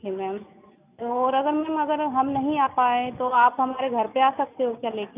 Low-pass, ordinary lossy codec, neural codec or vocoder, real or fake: 3.6 kHz; none; none; real